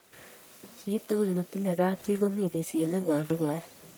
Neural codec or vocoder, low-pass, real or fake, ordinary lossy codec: codec, 44.1 kHz, 1.7 kbps, Pupu-Codec; none; fake; none